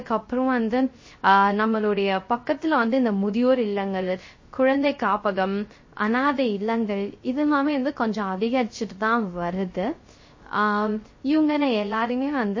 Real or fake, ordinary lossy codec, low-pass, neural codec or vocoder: fake; MP3, 32 kbps; 7.2 kHz; codec, 16 kHz, 0.3 kbps, FocalCodec